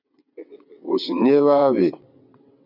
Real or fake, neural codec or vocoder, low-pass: fake; vocoder, 22.05 kHz, 80 mel bands, WaveNeXt; 5.4 kHz